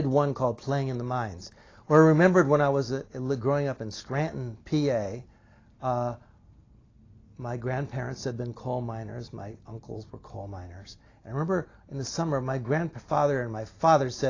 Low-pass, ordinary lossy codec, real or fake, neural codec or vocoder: 7.2 kHz; AAC, 32 kbps; real; none